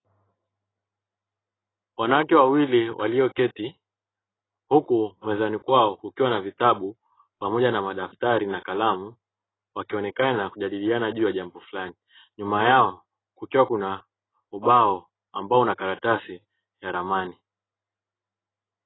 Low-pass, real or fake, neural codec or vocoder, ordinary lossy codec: 7.2 kHz; real; none; AAC, 16 kbps